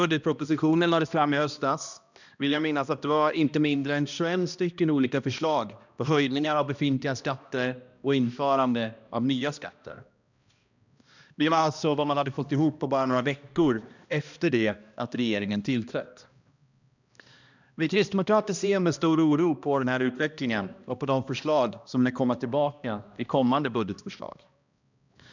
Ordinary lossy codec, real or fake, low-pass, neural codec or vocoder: none; fake; 7.2 kHz; codec, 16 kHz, 1 kbps, X-Codec, HuBERT features, trained on balanced general audio